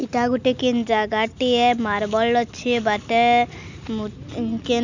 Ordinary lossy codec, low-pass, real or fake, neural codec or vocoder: none; 7.2 kHz; real; none